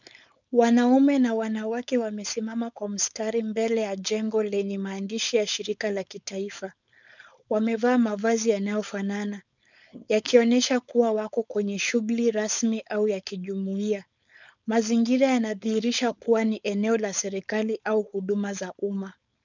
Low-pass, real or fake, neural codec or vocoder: 7.2 kHz; fake; codec, 16 kHz, 4.8 kbps, FACodec